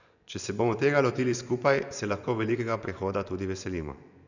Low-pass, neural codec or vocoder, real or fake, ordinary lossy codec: 7.2 kHz; vocoder, 24 kHz, 100 mel bands, Vocos; fake; none